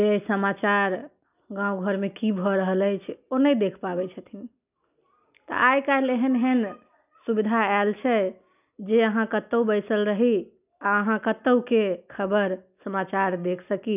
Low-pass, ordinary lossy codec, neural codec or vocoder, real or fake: 3.6 kHz; none; none; real